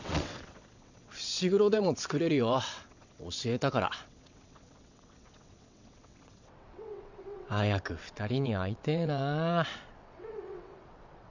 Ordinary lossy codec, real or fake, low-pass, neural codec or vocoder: none; fake; 7.2 kHz; vocoder, 22.05 kHz, 80 mel bands, WaveNeXt